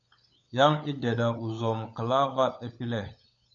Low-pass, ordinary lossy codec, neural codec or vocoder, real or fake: 7.2 kHz; MP3, 96 kbps; codec, 16 kHz, 16 kbps, FunCodec, trained on LibriTTS, 50 frames a second; fake